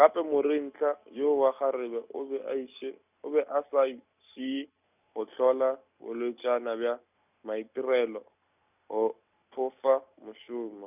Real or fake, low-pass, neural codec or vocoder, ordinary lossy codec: real; 3.6 kHz; none; none